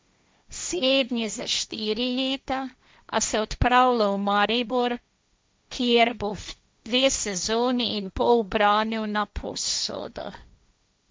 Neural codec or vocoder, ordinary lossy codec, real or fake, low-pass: codec, 16 kHz, 1.1 kbps, Voila-Tokenizer; none; fake; none